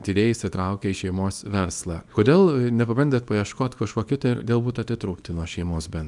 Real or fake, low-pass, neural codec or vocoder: fake; 10.8 kHz; codec, 24 kHz, 0.9 kbps, WavTokenizer, small release